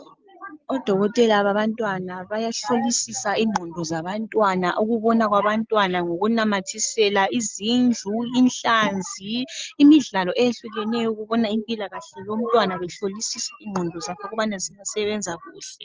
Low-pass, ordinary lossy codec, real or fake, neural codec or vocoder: 7.2 kHz; Opus, 24 kbps; real; none